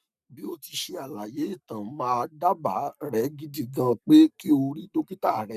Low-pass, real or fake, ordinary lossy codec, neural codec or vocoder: 14.4 kHz; fake; none; codec, 44.1 kHz, 7.8 kbps, Pupu-Codec